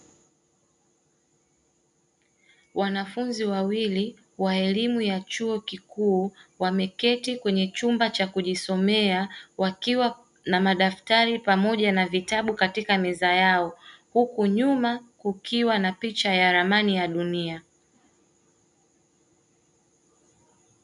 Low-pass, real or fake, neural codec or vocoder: 10.8 kHz; real; none